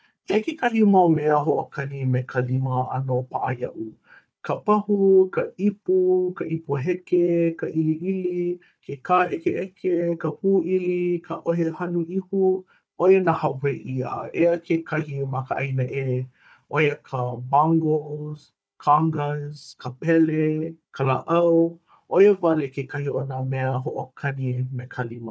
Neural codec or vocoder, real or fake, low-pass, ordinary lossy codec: codec, 16 kHz, 4 kbps, FunCodec, trained on Chinese and English, 50 frames a second; fake; none; none